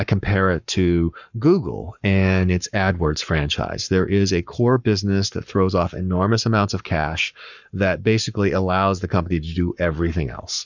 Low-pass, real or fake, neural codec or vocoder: 7.2 kHz; fake; codec, 44.1 kHz, 7.8 kbps, Pupu-Codec